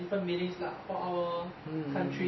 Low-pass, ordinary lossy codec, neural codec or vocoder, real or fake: 7.2 kHz; MP3, 24 kbps; none; real